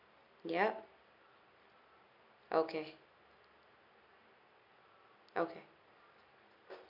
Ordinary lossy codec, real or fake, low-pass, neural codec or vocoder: none; real; 5.4 kHz; none